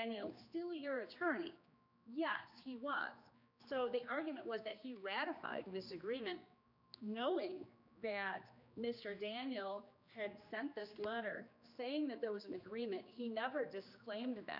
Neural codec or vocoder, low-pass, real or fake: codec, 16 kHz, 2 kbps, X-Codec, HuBERT features, trained on general audio; 5.4 kHz; fake